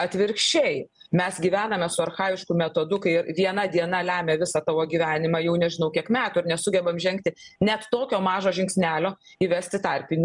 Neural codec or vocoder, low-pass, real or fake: none; 10.8 kHz; real